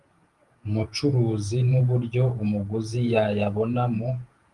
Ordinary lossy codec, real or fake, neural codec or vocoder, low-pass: Opus, 24 kbps; fake; vocoder, 48 kHz, 128 mel bands, Vocos; 10.8 kHz